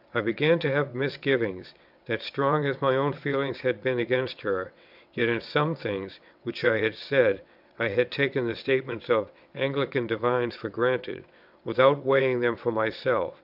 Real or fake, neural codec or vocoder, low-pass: fake; vocoder, 22.05 kHz, 80 mel bands, WaveNeXt; 5.4 kHz